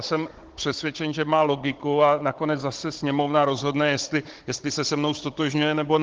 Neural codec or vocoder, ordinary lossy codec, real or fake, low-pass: codec, 16 kHz, 16 kbps, FunCodec, trained on Chinese and English, 50 frames a second; Opus, 16 kbps; fake; 7.2 kHz